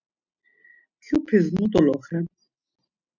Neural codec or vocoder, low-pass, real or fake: none; 7.2 kHz; real